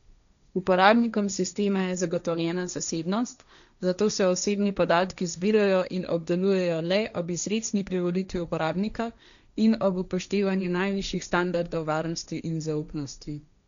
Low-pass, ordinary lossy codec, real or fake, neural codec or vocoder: 7.2 kHz; none; fake; codec, 16 kHz, 1.1 kbps, Voila-Tokenizer